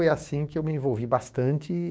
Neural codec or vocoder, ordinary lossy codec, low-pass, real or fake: codec, 16 kHz, 6 kbps, DAC; none; none; fake